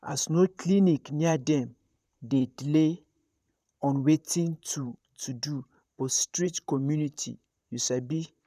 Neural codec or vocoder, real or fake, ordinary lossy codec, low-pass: vocoder, 44.1 kHz, 128 mel bands, Pupu-Vocoder; fake; none; 14.4 kHz